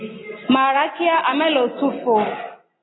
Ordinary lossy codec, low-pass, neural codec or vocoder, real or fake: AAC, 16 kbps; 7.2 kHz; none; real